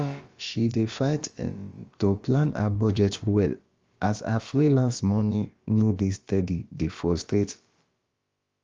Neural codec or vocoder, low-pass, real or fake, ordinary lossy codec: codec, 16 kHz, about 1 kbps, DyCAST, with the encoder's durations; 7.2 kHz; fake; Opus, 32 kbps